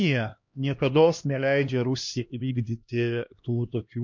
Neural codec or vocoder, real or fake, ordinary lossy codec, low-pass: codec, 16 kHz, 1 kbps, X-Codec, HuBERT features, trained on LibriSpeech; fake; MP3, 48 kbps; 7.2 kHz